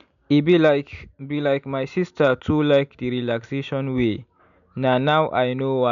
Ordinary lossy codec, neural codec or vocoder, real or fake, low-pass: none; none; real; 7.2 kHz